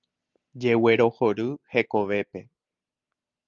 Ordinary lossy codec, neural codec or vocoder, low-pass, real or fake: Opus, 32 kbps; none; 7.2 kHz; real